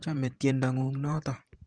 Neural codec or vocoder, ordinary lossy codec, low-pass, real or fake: vocoder, 44.1 kHz, 128 mel bands every 512 samples, BigVGAN v2; Opus, 24 kbps; 9.9 kHz; fake